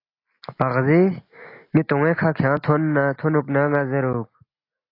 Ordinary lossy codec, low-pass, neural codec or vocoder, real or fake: AAC, 32 kbps; 5.4 kHz; none; real